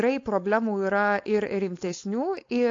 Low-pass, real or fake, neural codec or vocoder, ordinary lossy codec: 7.2 kHz; fake; codec, 16 kHz, 4.8 kbps, FACodec; AAC, 48 kbps